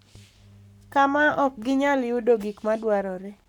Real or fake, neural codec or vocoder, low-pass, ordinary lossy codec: fake; codec, 44.1 kHz, 7.8 kbps, Pupu-Codec; 19.8 kHz; none